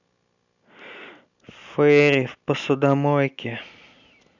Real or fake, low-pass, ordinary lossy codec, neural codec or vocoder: real; 7.2 kHz; none; none